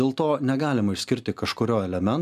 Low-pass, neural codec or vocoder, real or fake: 14.4 kHz; none; real